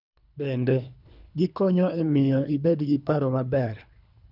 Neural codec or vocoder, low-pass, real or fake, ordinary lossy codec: codec, 24 kHz, 3 kbps, HILCodec; 5.4 kHz; fake; none